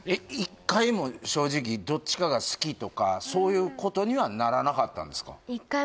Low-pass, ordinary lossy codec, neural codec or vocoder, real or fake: none; none; none; real